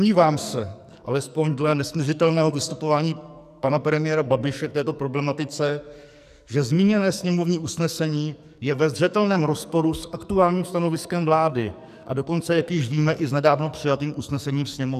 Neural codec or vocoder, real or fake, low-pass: codec, 44.1 kHz, 2.6 kbps, SNAC; fake; 14.4 kHz